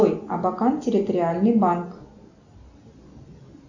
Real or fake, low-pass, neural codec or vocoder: real; 7.2 kHz; none